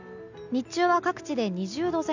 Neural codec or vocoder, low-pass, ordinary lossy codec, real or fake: none; 7.2 kHz; none; real